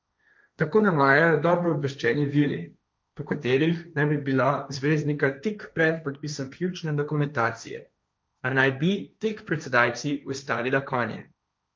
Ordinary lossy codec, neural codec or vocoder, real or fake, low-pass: none; codec, 16 kHz, 1.1 kbps, Voila-Tokenizer; fake; none